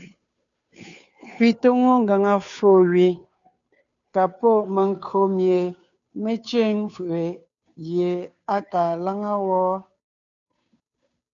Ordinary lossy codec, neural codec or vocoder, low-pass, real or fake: MP3, 96 kbps; codec, 16 kHz, 2 kbps, FunCodec, trained on Chinese and English, 25 frames a second; 7.2 kHz; fake